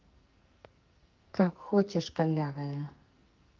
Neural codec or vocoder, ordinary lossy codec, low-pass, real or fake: codec, 44.1 kHz, 2.6 kbps, SNAC; Opus, 32 kbps; 7.2 kHz; fake